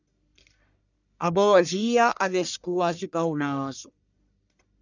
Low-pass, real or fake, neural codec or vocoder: 7.2 kHz; fake; codec, 44.1 kHz, 1.7 kbps, Pupu-Codec